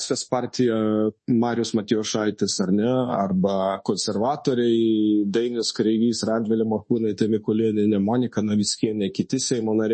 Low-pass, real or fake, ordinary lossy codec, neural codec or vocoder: 10.8 kHz; fake; MP3, 32 kbps; codec, 24 kHz, 1.2 kbps, DualCodec